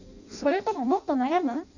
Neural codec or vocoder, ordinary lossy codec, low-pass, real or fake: codec, 16 kHz in and 24 kHz out, 0.6 kbps, FireRedTTS-2 codec; none; 7.2 kHz; fake